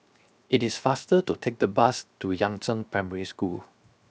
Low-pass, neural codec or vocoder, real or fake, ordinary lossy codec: none; codec, 16 kHz, 0.7 kbps, FocalCodec; fake; none